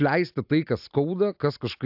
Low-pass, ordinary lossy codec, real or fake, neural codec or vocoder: 5.4 kHz; AAC, 48 kbps; real; none